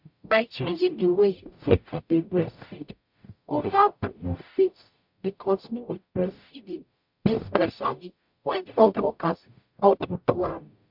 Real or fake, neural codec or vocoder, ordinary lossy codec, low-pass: fake; codec, 44.1 kHz, 0.9 kbps, DAC; MP3, 48 kbps; 5.4 kHz